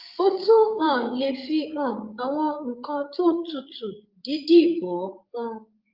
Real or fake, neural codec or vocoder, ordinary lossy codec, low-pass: fake; codec, 16 kHz, 8 kbps, FreqCodec, larger model; Opus, 24 kbps; 5.4 kHz